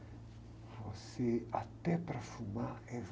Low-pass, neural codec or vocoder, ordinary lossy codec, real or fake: none; none; none; real